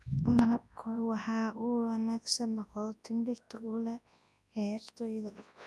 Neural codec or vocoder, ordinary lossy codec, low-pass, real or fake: codec, 24 kHz, 0.9 kbps, WavTokenizer, large speech release; none; none; fake